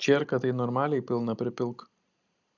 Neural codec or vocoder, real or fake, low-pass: none; real; 7.2 kHz